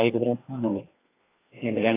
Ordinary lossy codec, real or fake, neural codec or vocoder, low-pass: AAC, 16 kbps; fake; codec, 16 kHz, 2 kbps, FreqCodec, larger model; 3.6 kHz